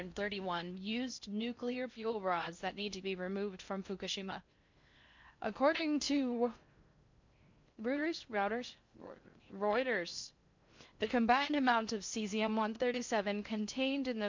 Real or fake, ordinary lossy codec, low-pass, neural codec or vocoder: fake; MP3, 64 kbps; 7.2 kHz; codec, 16 kHz in and 24 kHz out, 0.6 kbps, FocalCodec, streaming, 4096 codes